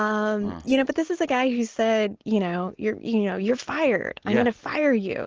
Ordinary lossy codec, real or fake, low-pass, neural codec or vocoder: Opus, 16 kbps; real; 7.2 kHz; none